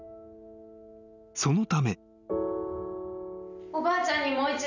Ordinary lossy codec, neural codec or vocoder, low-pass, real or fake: none; none; 7.2 kHz; real